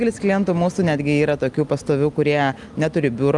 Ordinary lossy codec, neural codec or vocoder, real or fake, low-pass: Opus, 32 kbps; none; real; 10.8 kHz